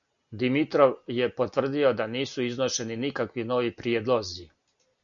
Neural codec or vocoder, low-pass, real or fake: none; 7.2 kHz; real